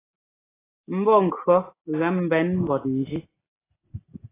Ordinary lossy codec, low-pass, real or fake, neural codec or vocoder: AAC, 16 kbps; 3.6 kHz; real; none